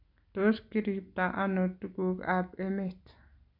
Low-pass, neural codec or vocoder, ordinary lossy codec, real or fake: 5.4 kHz; none; none; real